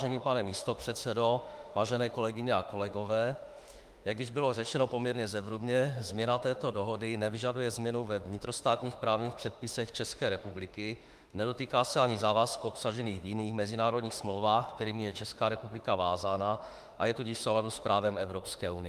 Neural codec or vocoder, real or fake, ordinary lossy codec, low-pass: autoencoder, 48 kHz, 32 numbers a frame, DAC-VAE, trained on Japanese speech; fake; Opus, 32 kbps; 14.4 kHz